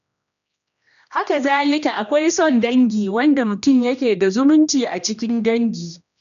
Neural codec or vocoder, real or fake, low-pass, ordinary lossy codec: codec, 16 kHz, 1 kbps, X-Codec, HuBERT features, trained on general audio; fake; 7.2 kHz; none